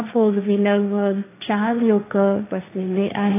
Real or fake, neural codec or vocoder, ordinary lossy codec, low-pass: fake; codec, 16 kHz, 1.1 kbps, Voila-Tokenizer; AAC, 24 kbps; 3.6 kHz